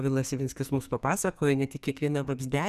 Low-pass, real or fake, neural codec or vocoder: 14.4 kHz; fake; codec, 44.1 kHz, 2.6 kbps, SNAC